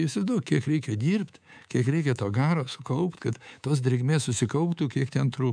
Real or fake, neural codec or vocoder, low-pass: fake; codec, 24 kHz, 3.1 kbps, DualCodec; 9.9 kHz